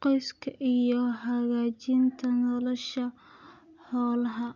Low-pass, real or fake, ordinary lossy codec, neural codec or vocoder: 7.2 kHz; real; none; none